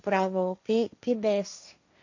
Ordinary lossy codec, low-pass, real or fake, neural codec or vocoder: AAC, 48 kbps; 7.2 kHz; fake; codec, 16 kHz, 1.1 kbps, Voila-Tokenizer